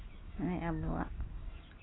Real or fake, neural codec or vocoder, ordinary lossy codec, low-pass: real; none; AAC, 16 kbps; 7.2 kHz